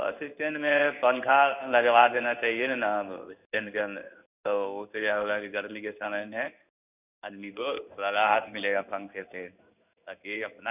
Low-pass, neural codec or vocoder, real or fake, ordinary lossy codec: 3.6 kHz; codec, 16 kHz in and 24 kHz out, 1 kbps, XY-Tokenizer; fake; none